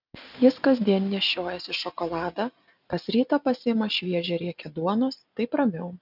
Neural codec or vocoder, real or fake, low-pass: none; real; 5.4 kHz